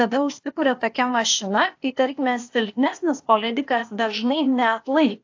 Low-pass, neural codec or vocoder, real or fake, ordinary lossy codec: 7.2 kHz; codec, 16 kHz, 0.8 kbps, ZipCodec; fake; AAC, 48 kbps